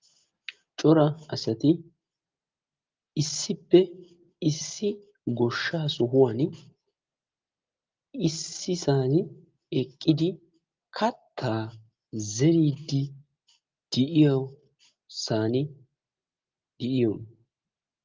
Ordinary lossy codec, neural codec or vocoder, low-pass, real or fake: Opus, 32 kbps; none; 7.2 kHz; real